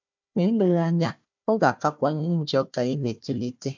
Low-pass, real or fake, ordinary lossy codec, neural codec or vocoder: 7.2 kHz; fake; MP3, 64 kbps; codec, 16 kHz, 1 kbps, FunCodec, trained on Chinese and English, 50 frames a second